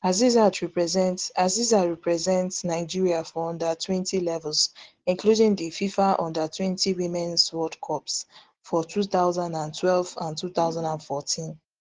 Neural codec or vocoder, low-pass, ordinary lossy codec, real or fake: none; 7.2 kHz; Opus, 16 kbps; real